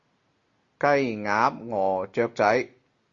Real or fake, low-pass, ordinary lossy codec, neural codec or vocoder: real; 7.2 kHz; Opus, 64 kbps; none